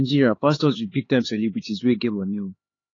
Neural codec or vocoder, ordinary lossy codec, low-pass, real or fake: codec, 16 kHz, 4 kbps, X-Codec, HuBERT features, trained on LibriSpeech; AAC, 32 kbps; 7.2 kHz; fake